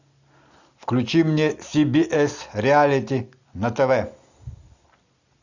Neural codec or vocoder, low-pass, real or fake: none; 7.2 kHz; real